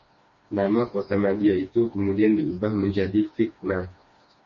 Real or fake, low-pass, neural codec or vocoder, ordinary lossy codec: fake; 7.2 kHz; codec, 16 kHz, 2 kbps, FreqCodec, smaller model; MP3, 32 kbps